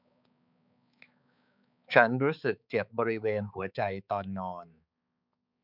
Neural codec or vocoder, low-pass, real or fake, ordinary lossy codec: codec, 16 kHz, 4 kbps, X-Codec, HuBERT features, trained on balanced general audio; 5.4 kHz; fake; none